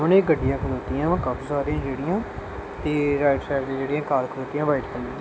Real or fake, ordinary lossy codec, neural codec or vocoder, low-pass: real; none; none; none